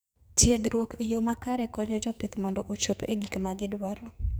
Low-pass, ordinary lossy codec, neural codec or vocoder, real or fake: none; none; codec, 44.1 kHz, 2.6 kbps, SNAC; fake